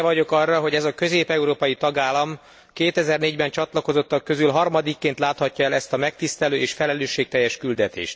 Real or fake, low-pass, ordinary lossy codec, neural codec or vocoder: real; none; none; none